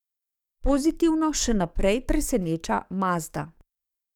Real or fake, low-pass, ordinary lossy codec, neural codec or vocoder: fake; 19.8 kHz; none; codec, 44.1 kHz, 7.8 kbps, DAC